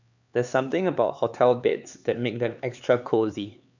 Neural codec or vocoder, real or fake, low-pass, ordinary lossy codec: codec, 16 kHz, 4 kbps, X-Codec, HuBERT features, trained on LibriSpeech; fake; 7.2 kHz; none